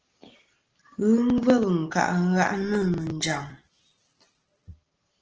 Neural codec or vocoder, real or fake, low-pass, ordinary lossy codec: none; real; 7.2 kHz; Opus, 16 kbps